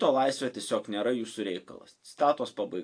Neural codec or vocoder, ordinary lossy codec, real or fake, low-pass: none; AAC, 48 kbps; real; 9.9 kHz